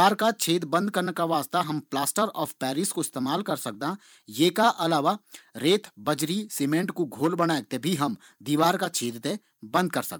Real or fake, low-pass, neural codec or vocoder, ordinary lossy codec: fake; none; vocoder, 44.1 kHz, 128 mel bands every 256 samples, BigVGAN v2; none